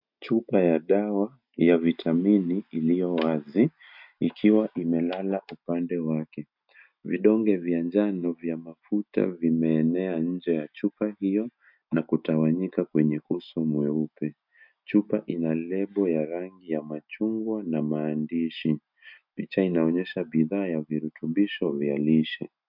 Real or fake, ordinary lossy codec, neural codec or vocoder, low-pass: real; MP3, 48 kbps; none; 5.4 kHz